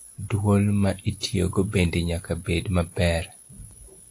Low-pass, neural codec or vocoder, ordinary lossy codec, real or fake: 10.8 kHz; none; AAC, 48 kbps; real